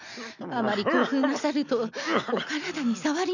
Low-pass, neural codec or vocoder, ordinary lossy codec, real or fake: 7.2 kHz; none; none; real